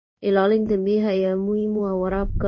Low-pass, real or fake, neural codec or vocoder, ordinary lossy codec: 7.2 kHz; fake; codec, 16 kHz in and 24 kHz out, 1 kbps, XY-Tokenizer; MP3, 32 kbps